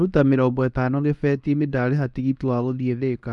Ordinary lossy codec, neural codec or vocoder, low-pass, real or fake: none; codec, 24 kHz, 0.9 kbps, WavTokenizer, medium speech release version 2; 10.8 kHz; fake